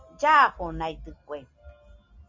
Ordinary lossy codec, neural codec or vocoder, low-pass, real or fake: MP3, 64 kbps; none; 7.2 kHz; real